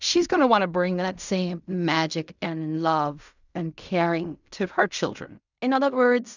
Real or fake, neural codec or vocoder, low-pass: fake; codec, 16 kHz in and 24 kHz out, 0.4 kbps, LongCat-Audio-Codec, fine tuned four codebook decoder; 7.2 kHz